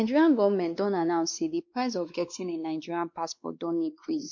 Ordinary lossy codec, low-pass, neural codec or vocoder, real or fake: MP3, 48 kbps; 7.2 kHz; codec, 16 kHz, 2 kbps, X-Codec, WavLM features, trained on Multilingual LibriSpeech; fake